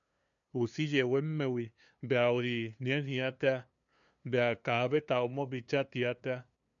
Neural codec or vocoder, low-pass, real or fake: codec, 16 kHz, 2 kbps, FunCodec, trained on LibriTTS, 25 frames a second; 7.2 kHz; fake